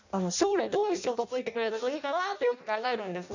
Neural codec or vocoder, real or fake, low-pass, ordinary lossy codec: codec, 16 kHz in and 24 kHz out, 0.6 kbps, FireRedTTS-2 codec; fake; 7.2 kHz; none